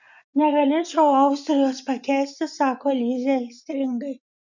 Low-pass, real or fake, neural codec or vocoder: 7.2 kHz; fake; vocoder, 24 kHz, 100 mel bands, Vocos